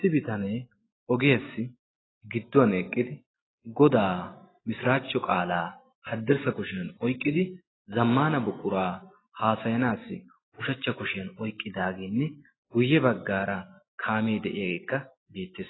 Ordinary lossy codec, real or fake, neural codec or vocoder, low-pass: AAC, 16 kbps; real; none; 7.2 kHz